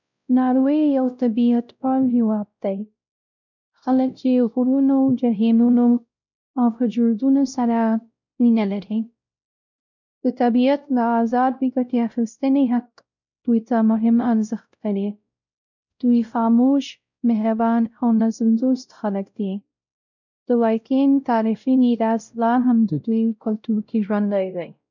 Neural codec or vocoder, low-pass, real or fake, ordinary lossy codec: codec, 16 kHz, 0.5 kbps, X-Codec, WavLM features, trained on Multilingual LibriSpeech; 7.2 kHz; fake; none